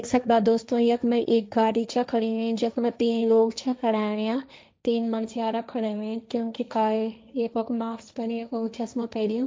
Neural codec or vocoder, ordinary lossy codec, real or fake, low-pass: codec, 16 kHz, 1.1 kbps, Voila-Tokenizer; none; fake; none